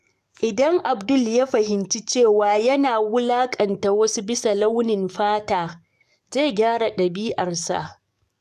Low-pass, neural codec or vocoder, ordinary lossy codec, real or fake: 14.4 kHz; codec, 44.1 kHz, 7.8 kbps, DAC; none; fake